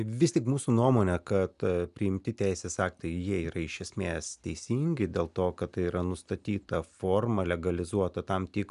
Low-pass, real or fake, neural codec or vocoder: 10.8 kHz; real; none